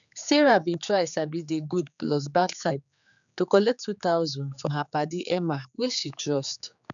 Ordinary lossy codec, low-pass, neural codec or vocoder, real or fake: none; 7.2 kHz; codec, 16 kHz, 4 kbps, X-Codec, HuBERT features, trained on general audio; fake